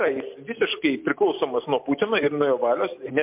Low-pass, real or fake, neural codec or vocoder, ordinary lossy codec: 3.6 kHz; real; none; MP3, 32 kbps